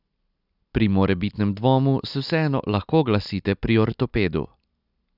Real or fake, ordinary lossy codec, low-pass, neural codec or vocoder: real; none; 5.4 kHz; none